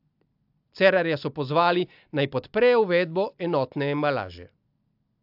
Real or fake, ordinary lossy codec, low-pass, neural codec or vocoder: real; AAC, 48 kbps; 5.4 kHz; none